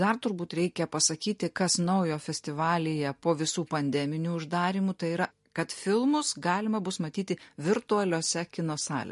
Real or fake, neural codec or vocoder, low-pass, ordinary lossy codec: real; none; 14.4 kHz; MP3, 48 kbps